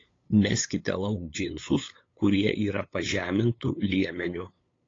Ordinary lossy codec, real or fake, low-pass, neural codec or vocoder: AAC, 32 kbps; fake; 7.2 kHz; codec, 16 kHz, 8 kbps, FunCodec, trained on LibriTTS, 25 frames a second